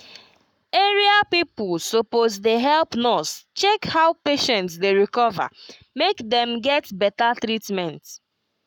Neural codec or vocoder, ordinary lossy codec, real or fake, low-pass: codec, 44.1 kHz, 7.8 kbps, Pupu-Codec; none; fake; 19.8 kHz